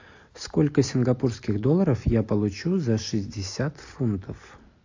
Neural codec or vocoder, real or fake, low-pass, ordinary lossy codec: none; real; 7.2 kHz; AAC, 48 kbps